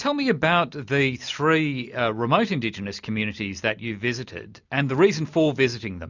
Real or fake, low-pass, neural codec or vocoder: real; 7.2 kHz; none